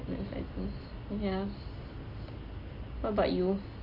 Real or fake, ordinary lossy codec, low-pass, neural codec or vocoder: real; MP3, 32 kbps; 5.4 kHz; none